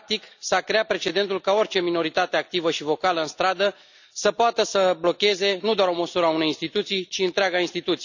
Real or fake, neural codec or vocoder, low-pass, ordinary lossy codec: real; none; 7.2 kHz; none